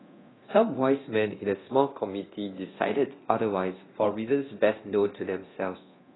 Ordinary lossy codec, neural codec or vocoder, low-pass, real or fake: AAC, 16 kbps; codec, 24 kHz, 0.9 kbps, DualCodec; 7.2 kHz; fake